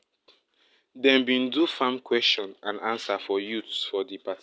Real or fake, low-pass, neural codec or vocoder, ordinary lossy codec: real; none; none; none